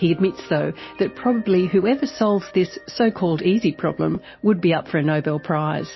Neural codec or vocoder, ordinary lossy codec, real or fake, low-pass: none; MP3, 24 kbps; real; 7.2 kHz